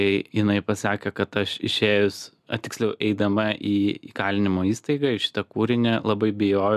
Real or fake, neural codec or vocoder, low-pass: real; none; 14.4 kHz